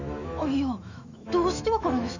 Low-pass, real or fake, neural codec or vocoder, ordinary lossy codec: 7.2 kHz; fake; autoencoder, 48 kHz, 128 numbers a frame, DAC-VAE, trained on Japanese speech; none